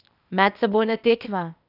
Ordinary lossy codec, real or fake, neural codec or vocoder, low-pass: none; fake; codec, 16 kHz, 0.8 kbps, ZipCodec; 5.4 kHz